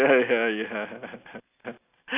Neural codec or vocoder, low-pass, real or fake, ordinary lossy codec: none; 3.6 kHz; real; none